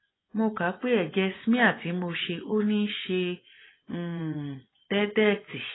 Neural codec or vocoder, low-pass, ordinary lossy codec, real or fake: vocoder, 24 kHz, 100 mel bands, Vocos; 7.2 kHz; AAC, 16 kbps; fake